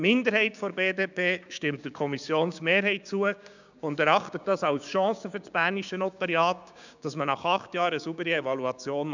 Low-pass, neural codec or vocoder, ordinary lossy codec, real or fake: 7.2 kHz; codec, 16 kHz, 6 kbps, DAC; none; fake